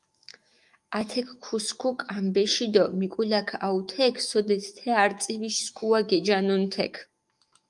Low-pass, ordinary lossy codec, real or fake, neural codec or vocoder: 10.8 kHz; Opus, 32 kbps; fake; autoencoder, 48 kHz, 128 numbers a frame, DAC-VAE, trained on Japanese speech